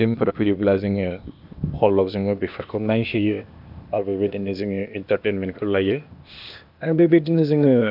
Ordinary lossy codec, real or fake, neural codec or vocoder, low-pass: none; fake; codec, 16 kHz, 0.8 kbps, ZipCodec; 5.4 kHz